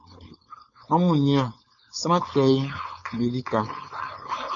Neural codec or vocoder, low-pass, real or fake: codec, 16 kHz, 4.8 kbps, FACodec; 7.2 kHz; fake